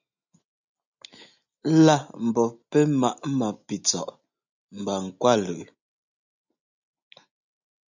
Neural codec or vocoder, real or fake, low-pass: none; real; 7.2 kHz